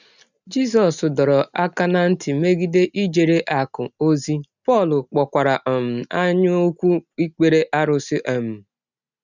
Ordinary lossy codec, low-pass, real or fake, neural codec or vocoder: none; 7.2 kHz; real; none